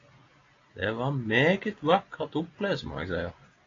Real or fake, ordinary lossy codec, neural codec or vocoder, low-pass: real; AAC, 32 kbps; none; 7.2 kHz